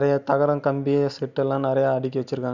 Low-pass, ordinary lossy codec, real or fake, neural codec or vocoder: 7.2 kHz; none; real; none